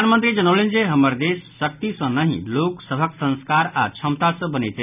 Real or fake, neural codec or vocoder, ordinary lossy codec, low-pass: real; none; none; 3.6 kHz